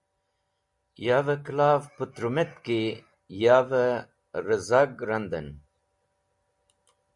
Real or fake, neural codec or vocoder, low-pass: real; none; 10.8 kHz